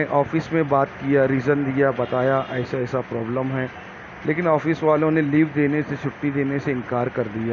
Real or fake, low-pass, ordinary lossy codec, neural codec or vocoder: real; 7.2 kHz; none; none